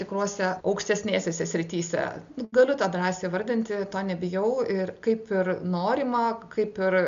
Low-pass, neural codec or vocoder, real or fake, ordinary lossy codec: 7.2 kHz; none; real; MP3, 64 kbps